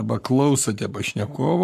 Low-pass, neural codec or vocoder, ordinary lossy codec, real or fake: 14.4 kHz; codec, 44.1 kHz, 7.8 kbps, Pupu-Codec; AAC, 96 kbps; fake